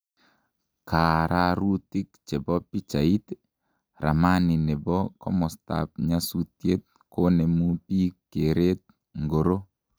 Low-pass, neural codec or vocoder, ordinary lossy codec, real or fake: none; none; none; real